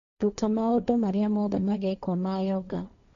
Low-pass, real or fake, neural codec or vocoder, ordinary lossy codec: 7.2 kHz; fake; codec, 16 kHz, 1.1 kbps, Voila-Tokenizer; none